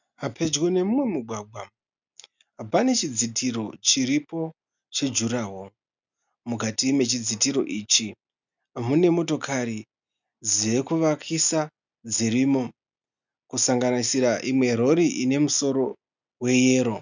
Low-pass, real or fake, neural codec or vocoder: 7.2 kHz; real; none